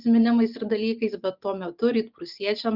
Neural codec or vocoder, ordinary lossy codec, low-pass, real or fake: none; Opus, 64 kbps; 5.4 kHz; real